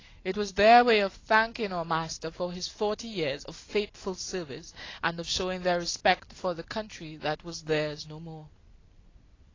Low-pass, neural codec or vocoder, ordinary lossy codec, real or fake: 7.2 kHz; none; AAC, 32 kbps; real